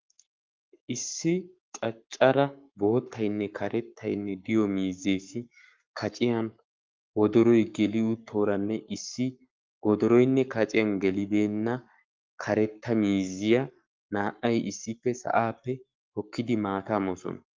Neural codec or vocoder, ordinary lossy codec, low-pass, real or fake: codec, 44.1 kHz, 7.8 kbps, Pupu-Codec; Opus, 24 kbps; 7.2 kHz; fake